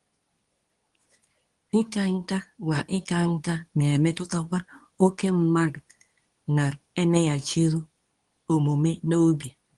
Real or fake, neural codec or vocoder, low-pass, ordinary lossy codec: fake; codec, 24 kHz, 0.9 kbps, WavTokenizer, medium speech release version 2; 10.8 kHz; Opus, 24 kbps